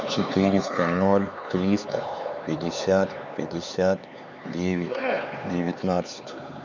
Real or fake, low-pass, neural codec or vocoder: fake; 7.2 kHz; codec, 16 kHz, 4 kbps, X-Codec, HuBERT features, trained on LibriSpeech